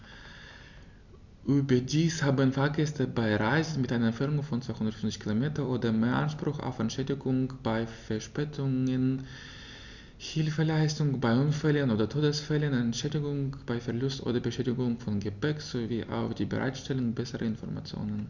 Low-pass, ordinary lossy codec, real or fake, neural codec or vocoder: 7.2 kHz; none; real; none